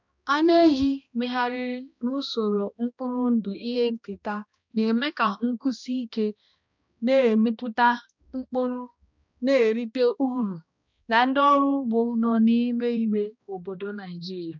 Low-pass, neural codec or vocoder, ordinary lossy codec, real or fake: 7.2 kHz; codec, 16 kHz, 1 kbps, X-Codec, HuBERT features, trained on balanced general audio; MP3, 64 kbps; fake